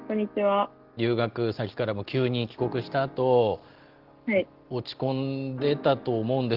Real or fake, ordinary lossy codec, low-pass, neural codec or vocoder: real; Opus, 16 kbps; 5.4 kHz; none